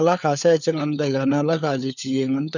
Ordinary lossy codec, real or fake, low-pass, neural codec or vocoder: none; fake; 7.2 kHz; codec, 16 kHz, 4 kbps, FunCodec, trained on LibriTTS, 50 frames a second